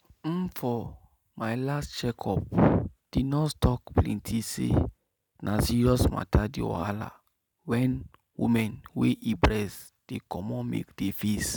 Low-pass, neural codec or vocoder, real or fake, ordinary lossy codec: none; none; real; none